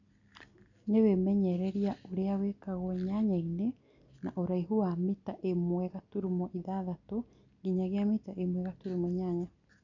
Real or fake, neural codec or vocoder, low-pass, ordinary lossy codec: real; none; 7.2 kHz; none